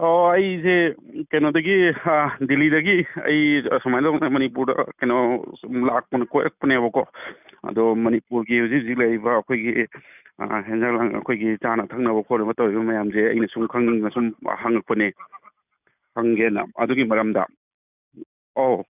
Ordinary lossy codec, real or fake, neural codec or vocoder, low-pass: none; real; none; 3.6 kHz